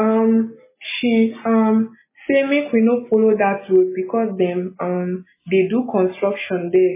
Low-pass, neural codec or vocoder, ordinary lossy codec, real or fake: 3.6 kHz; none; MP3, 16 kbps; real